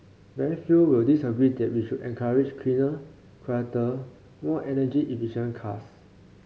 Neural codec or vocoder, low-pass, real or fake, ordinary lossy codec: none; none; real; none